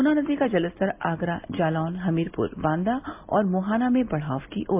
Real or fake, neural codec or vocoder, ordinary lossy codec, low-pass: real; none; MP3, 32 kbps; 3.6 kHz